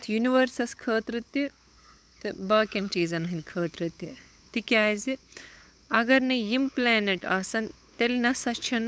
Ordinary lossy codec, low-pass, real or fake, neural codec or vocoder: none; none; fake; codec, 16 kHz, 8 kbps, FunCodec, trained on LibriTTS, 25 frames a second